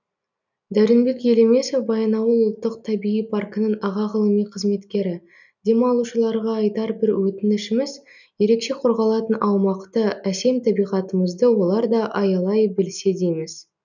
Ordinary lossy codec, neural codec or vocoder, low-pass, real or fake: none; none; 7.2 kHz; real